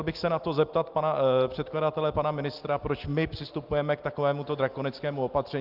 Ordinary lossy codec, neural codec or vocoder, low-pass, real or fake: Opus, 32 kbps; none; 5.4 kHz; real